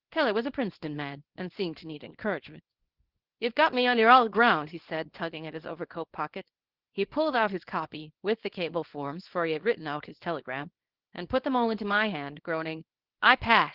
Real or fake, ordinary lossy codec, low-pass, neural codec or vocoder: fake; Opus, 16 kbps; 5.4 kHz; codec, 24 kHz, 0.9 kbps, WavTokenizer, small release